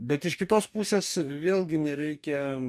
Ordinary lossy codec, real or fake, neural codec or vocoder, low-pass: MP3, 96 kbps; fake; codec, 44.1 kHz, 2.6 kbps, DAC; 14.4 kHz